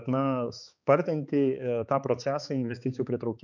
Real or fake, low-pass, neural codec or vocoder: fake; 7.2 kHz; codec, 16 kHz, 4 kbps, X-Codec, HuBERT features, trained on balanced general audio